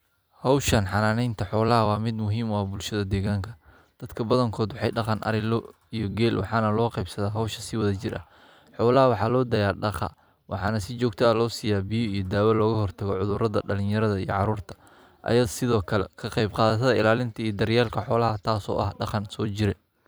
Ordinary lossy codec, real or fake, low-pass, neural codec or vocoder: none; fake; none; vocoder, 44.1 kHz, 128 mel bands every 256 samples, BigVGAN v2